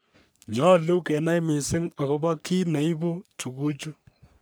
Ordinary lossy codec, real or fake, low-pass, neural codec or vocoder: none; fake; none; codec, 44.1 kHz, 3.4 kbps, Pupu-Codec